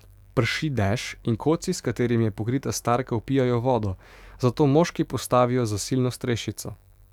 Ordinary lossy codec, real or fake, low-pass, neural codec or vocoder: none; fake; 19.8 kHz; autoencoder, 48 kHz, 128 numbers a frame, DAC-VAE, trained on Japanese speech